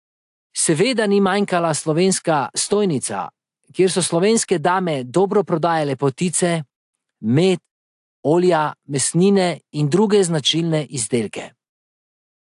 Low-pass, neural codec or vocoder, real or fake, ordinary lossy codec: 10.8 kHz; none; real; AAC, 64 kbps